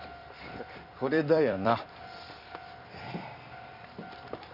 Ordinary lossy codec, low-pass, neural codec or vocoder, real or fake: none; 5.4 kHz; vocoder, 44.1 kHz, 128 mel bands every 256 samples, BigVGAN v2; fake